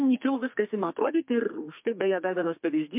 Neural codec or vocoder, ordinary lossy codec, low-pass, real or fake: codec, 32 kHz, 1.9 kbps, SNAC; MP3, 32 kbps; 3.6 kHz; fake